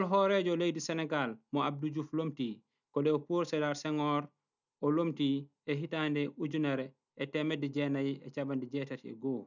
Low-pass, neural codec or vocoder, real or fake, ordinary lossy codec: 7.2 kHz; none; real; none